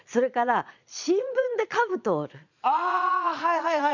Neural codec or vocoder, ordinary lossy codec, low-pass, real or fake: vocoder, 22.05 kHz, 80 mel bands, Vocos; none; 7.2 kHz; fake